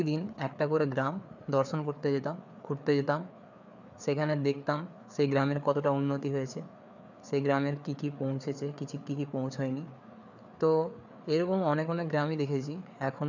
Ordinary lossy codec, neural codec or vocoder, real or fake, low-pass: none; codec, 16 kHz, 4 kbps, FreqCodec, larger model; fake; 7.2 kHz